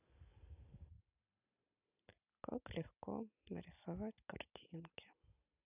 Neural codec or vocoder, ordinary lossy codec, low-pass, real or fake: none; none; 3.6 kHz; real